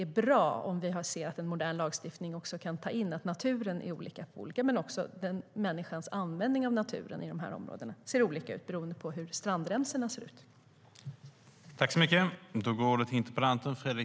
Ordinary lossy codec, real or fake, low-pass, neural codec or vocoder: none; real; none; none